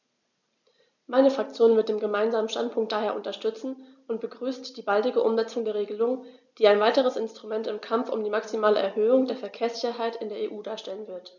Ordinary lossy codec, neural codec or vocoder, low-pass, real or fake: none; none; 7.2 kHz; real